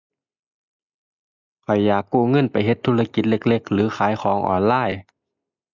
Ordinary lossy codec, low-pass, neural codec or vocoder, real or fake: none; 7.2 kHz; none; real